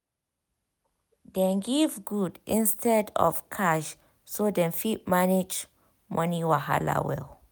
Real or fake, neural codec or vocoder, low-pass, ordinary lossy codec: real; none; none; none